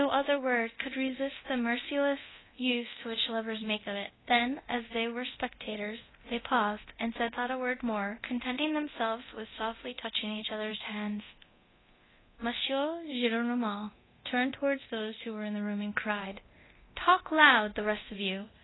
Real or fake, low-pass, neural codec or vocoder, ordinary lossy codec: fake; 7.2 kHz; codec, 24 kHz, 0.9 kbps, DualCodec; AAC, 16 kbps